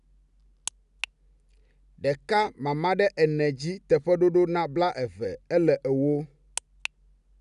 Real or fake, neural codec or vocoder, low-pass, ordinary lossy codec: real; none; 10.8 kHz; none